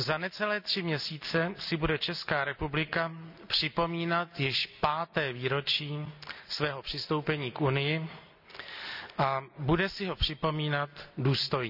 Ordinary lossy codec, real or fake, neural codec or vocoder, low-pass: none; real; none; 5.4 kHz